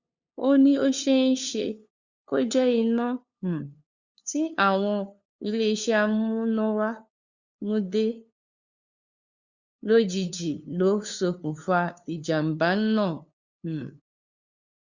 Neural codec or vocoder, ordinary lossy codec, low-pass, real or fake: codec, 16 kHz, 2 kbps, FunCodec, trained on LibriTTS, 25 frames a second; Opus, 64 kbps; 7.2 kHz; fake